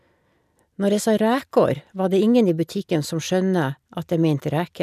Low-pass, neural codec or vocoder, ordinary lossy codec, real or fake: 14.4 kHz; none; none; real